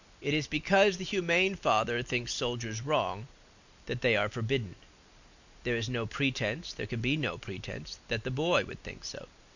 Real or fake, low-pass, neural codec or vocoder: real; 7.2 kHz; none